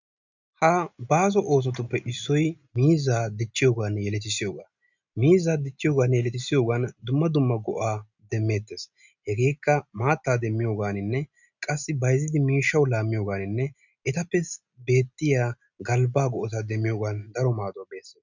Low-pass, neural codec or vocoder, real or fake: 7.2 kHz; none; real